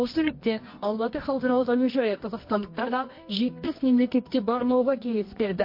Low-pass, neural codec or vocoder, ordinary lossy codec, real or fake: 5.4 kHz; codec, 24 kHz, 0.9 kbps, WavTokenizer, medium music audio release; MP3, 32 kbps; fake